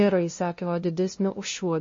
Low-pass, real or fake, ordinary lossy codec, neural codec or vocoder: 7.2 kHz; fake; MP3, 32 kbps; codec, 16 kHz, 0.5 kbps, FunCodec, trained on LibriTTS, 25 frames a second